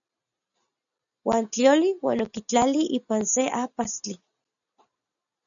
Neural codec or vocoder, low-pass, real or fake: none; 7.2 kHz; real